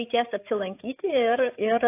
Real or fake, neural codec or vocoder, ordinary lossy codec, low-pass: fake; codec, 16 kHz, 8 kbps, FreqCodec, larger model; AAC, 24 kbps; 3.6 kHz